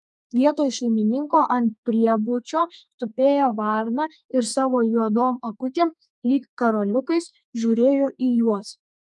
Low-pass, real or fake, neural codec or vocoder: 10.8 kHz; fake; codec, 32 kHz, 1.9 kbps, SNAC